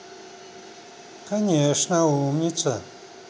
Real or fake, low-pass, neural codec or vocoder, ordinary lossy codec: real; none; none; none